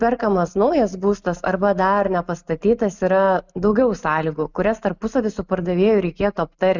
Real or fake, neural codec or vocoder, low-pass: real; none; 7.2 kHz